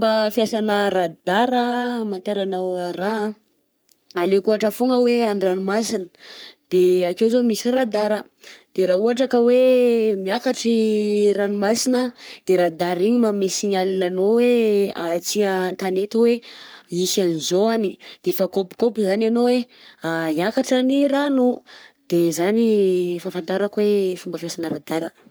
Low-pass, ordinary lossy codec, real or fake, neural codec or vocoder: none; none; fake; codec, 44.1 kHz, 3.4 kbps, Pupu-Codec